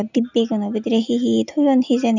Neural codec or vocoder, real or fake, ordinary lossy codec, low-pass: none; real; none; 7.2 kHz